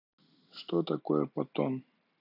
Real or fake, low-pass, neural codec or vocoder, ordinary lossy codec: real; 5.4 kHz; none; none